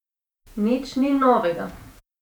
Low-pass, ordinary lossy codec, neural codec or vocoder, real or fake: 19.8 kHz; none; vocoder, 48 kHz, 128 mel bands, Vocos; fake